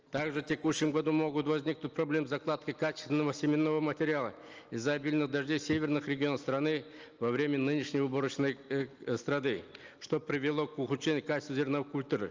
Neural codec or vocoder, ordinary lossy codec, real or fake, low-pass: none; Opus, 24 kbps; real; 7.2 kHz